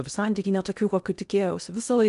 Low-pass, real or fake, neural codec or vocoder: 10.8 kHz; fake; codec, 16 kHz in and 24 kHz out, 0.8 kbps, FocalCodec, streaming, 65536 codes